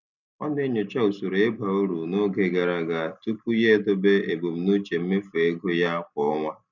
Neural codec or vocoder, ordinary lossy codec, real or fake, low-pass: none; none; real; 7.2 kHz